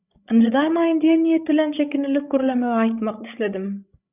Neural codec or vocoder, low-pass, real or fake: codec, 16 kHz, 16 kbps, FreqCodec, larger model; 3.6 kHz; fake